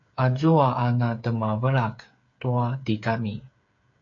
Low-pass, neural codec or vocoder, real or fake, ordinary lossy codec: 7.2 kHz; codec, 16 kHz, 8 kbps, FreqCodec, smaller model; fake; AAC, 48 kbps